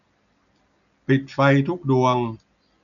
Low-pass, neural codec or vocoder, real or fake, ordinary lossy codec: 7.2 kHz; none; real; none